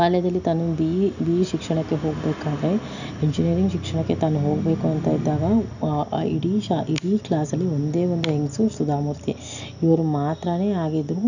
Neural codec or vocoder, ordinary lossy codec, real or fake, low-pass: none; none; real; 7.2 kHz